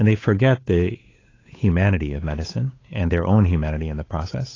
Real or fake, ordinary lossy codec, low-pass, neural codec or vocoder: fake; AAC, 32 kbps; 7.2 kHz; codec, 16 kHz, 8 kbps, FunCodec, trained on Chinese and English, 25 frames a second